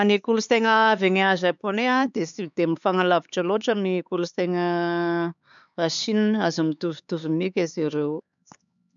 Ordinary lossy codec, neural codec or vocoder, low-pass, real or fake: none; codec, 16 kHz, 4 kbps, X-Codec, HuBERT features, trained on LibriSpeech; 7.2 kHz; fake